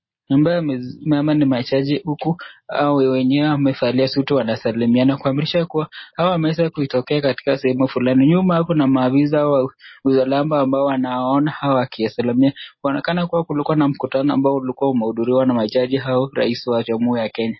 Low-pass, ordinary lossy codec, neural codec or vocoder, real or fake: 7.2 kHz; MP3, 24 kbps; none; real